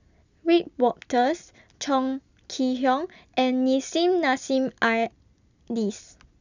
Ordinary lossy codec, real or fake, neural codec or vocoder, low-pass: none; real; none; 7.2 kHz